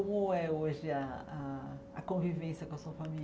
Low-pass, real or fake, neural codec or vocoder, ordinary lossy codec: none; real; none; none